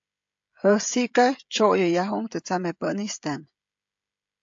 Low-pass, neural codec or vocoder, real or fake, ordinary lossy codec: 7.2 kHz; codec, 16 kHz, 16 kbps, FreqCodec, smaller model; fake; AAC, 64 kbps